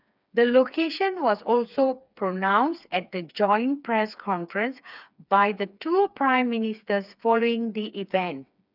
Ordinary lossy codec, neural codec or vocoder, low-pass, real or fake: none; codec, 16 kHz, 4 kbps, FreqCodec, smaller model; 5.4 kHz; fake